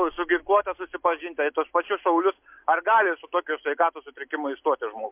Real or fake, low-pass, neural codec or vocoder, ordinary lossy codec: real; 3.6 kHz; none; MP3, 32 kbps